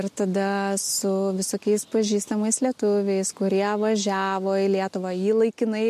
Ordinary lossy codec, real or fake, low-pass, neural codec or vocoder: MP3, 64 kbps; real; 14.4 kHz; none